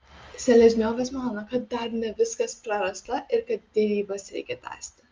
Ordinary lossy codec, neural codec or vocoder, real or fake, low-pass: Opus, 32 kbps; none; real; 7.2 kHz